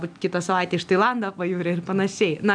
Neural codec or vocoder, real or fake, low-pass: none; real; 9.9 kHz